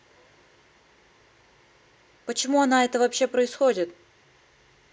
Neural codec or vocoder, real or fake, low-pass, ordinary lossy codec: none; real; none; none